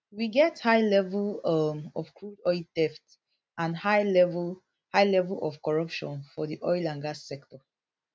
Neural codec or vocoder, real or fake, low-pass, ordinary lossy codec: none; real; none; none